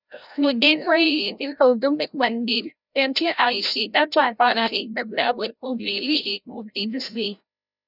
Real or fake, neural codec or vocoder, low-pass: fake; codec, 16 kHz, 0.5 kbps, FreqCodec, larger model; 5.4 kHz